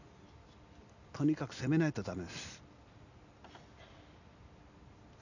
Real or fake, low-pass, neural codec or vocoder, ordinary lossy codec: real; 7.2 kHz; none; none